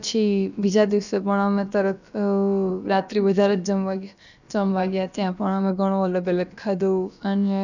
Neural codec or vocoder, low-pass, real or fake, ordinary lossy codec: codec, 16 kHz, about 1 kbps, DyCAST, with the encoder's durations; 7.2 kHz; fake; none